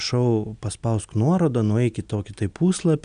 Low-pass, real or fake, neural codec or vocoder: 9.9 kHz; real; none